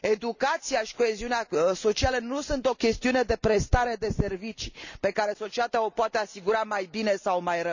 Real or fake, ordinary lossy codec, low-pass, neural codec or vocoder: real; none; 7.2 kHz; none